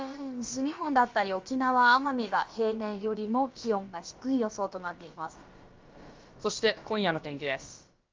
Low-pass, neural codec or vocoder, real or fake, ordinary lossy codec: 7.2 kHz; codec, 16 kHz, about 1 kbps, DyCAST, with the encoder's durations; fake; Opus, 32 kbps